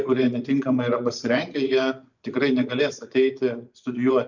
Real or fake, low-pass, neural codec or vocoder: fake; 7.2 kHz; vocoder, 24 kHz, 100 mel bands, Vocos